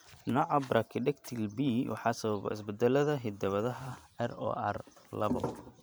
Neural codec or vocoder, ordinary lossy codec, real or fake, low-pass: vocoder, 44.1 kHz, 128 mel bands every 512 samples, BigVGAN v2; none; fake; none